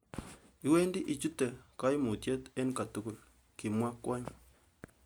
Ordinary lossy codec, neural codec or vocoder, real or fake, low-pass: none; none; real; none